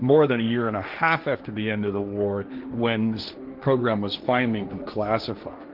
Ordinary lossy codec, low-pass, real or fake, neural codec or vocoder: Opus, 32 kbps; 5.4 kHz; fake; codec, 16 kHz, 1.1 kbps, Voila-Tokenizer